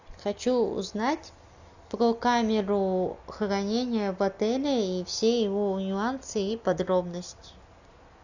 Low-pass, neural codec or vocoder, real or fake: 7.2 kHz; none; real